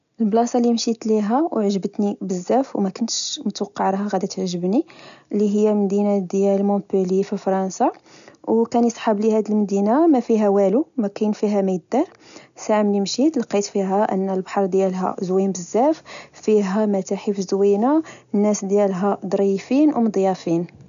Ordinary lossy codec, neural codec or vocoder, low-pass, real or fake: none; none; 7.2 kHz; real